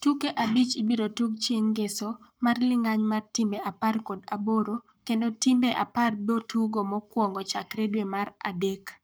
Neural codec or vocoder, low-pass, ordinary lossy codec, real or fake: codec, 44.1 kHz, 7.8 kbps, Pupu-Codec; none; none; fake